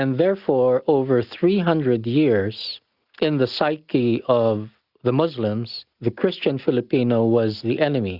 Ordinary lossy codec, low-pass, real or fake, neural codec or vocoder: Opus, 64 kbps; 5.4 kHz; real; none